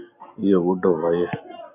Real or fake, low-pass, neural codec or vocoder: real; 3.6 kHz; none